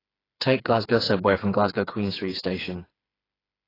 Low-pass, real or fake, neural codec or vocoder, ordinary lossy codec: 5.4 kHz; fake; codec, 16 kHz, 4 kbps, FreqCodec, smaller model; AAC, 24 kbps